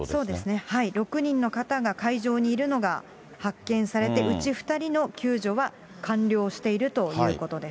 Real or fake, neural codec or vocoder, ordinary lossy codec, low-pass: real; none; none; none